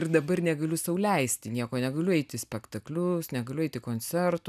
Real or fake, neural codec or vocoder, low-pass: real; none; 14.4 kHz